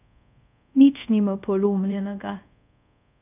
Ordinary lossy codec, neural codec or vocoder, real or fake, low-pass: none; codec, 24 kHz, 0.5 kbps, DualCodec; fake; 3.6 kHz